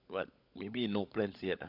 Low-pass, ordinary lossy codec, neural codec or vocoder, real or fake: 5.4 kHz; none; codec, 16 kHz, 16 kbps, FunCodec, trained on LibriTTS, 50 frames a second; fake